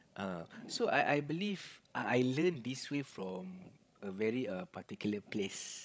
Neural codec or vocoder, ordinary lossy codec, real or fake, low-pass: codec, 16 kHz, 16 kbps, FreqCodec, larger model; none; fake; none